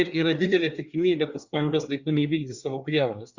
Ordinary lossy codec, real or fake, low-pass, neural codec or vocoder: Opus, 64 kbps; fake; 7.2 kHz; codec, 24 kHz, 1 kbps, SNAC